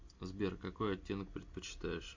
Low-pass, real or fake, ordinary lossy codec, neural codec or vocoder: 7.2 kHz; real; MP3, 48 kbps; none